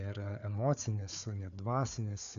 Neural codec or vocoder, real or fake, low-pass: codec, 16 kHz, 4 kbps, FunCodec, trained on Chinese and English, 50 frames a second; fake; 7.2 kHz